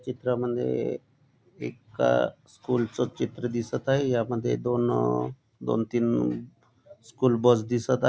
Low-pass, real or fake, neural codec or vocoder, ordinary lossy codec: none; real; none; none